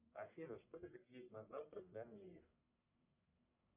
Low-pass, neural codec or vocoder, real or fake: 3.6 kHz; codec, 44.1 kHz, 1.7 kbps, Pupu-Codec; fake